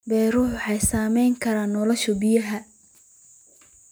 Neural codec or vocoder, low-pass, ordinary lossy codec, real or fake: none; none; none; real